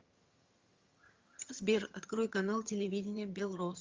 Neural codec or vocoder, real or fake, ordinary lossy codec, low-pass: vocoder, 22.05 kHz, 80 mel bands, HiFi-GAN; fake; Opus, 32 kbps; 7.2 kHz